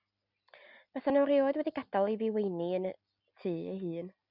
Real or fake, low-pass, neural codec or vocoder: real; 5.4 kHz; none